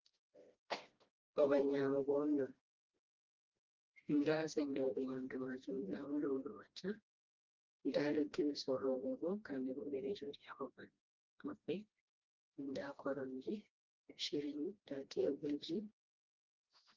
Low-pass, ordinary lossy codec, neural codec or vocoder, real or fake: 7.2 kHz; Opus, 24 kbps; codec, 16 kHz, 1 kbps, FreqCodec, smaller model; fake